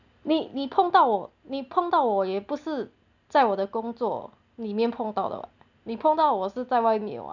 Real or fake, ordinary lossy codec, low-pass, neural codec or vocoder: real; none; 7.2 kHz; none